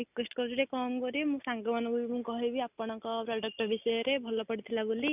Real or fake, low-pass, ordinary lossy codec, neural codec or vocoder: real; 3.6 kHz; none; none